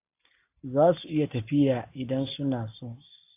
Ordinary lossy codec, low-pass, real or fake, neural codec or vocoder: AAC, 24 kbps; 3.6 kHz; real; none